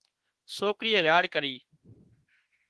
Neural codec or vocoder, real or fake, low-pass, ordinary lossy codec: autoencoder, 48 kHz, 32 numbers a frame, DAC-VAE, trained on Japanese speech; fake; 10.8 kHz; Opus, 16 kbps